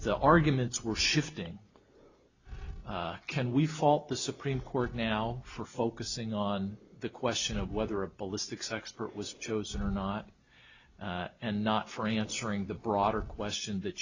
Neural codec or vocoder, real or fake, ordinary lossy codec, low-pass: none; real; Opus, 64 kbps; 7.2 kHz